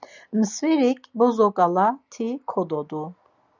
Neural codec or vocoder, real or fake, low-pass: none; real; 7.2 kHz